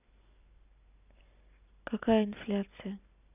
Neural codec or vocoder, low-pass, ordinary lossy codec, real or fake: none; 3.6 kHz; none; real